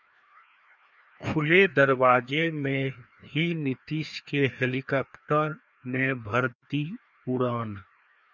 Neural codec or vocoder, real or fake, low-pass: codec, 16 kHz, 2 kbps, FreqCodec, larger model; fake; 7.2 kHz